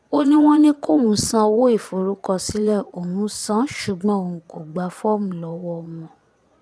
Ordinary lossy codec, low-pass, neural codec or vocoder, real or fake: none; none; vocoder, 22.05 kHz, 80 mel bands, Vocos; fake